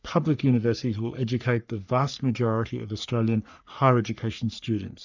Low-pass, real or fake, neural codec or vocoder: 7.2 kHz; fake; codec, 44.1 kHz, 3.4 kbps, Pupu-Codec